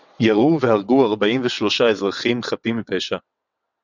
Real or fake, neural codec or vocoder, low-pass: fake; vocoder, 22.05 kHz, 80 mel bands, WaveNeXt; 7.2 kHz